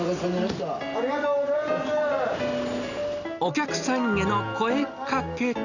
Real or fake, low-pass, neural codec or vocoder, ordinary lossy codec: real; 7.2 kHz; none; none